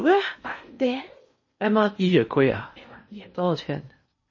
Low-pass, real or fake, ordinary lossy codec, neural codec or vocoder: 7.2 kHz; fake; MP3, 32 kbps; codec, 16 kHz in and 24 kHz out, 0.8 kbps, FocalCodec, streaming, 65536 codes